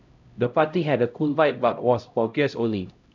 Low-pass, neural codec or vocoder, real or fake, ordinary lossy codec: 7.2 kHz; codec, 16 kHz, 0.5 kbps, X-Codec, HuBERT features, trained on LibriSpeech; fake; none